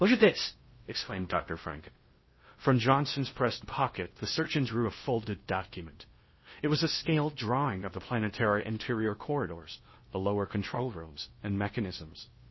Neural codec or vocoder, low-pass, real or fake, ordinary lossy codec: codec, 16 kHz in and 24 kHz out, 0.6 kbps, FocalCodec, streaming, 4096 codes; 7.2 kHz; fake; MP3, 24 kbps